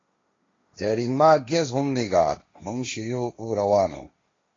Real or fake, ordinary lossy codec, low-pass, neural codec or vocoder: fake; AAC, 32 kbps; 7.2 kHz; codec, 16 kHz, 1.1 kbps, Voila-Tokenizer